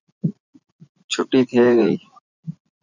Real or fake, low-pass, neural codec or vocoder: fake; 7.2 kHz; vocoder, 44.1 kHz, 80 mel bands, Vocos